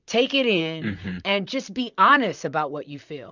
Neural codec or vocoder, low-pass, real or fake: vocoder, 44.1 kHz, 128 mel bands, Pupu-Vocoder; 7.2 kHz; fake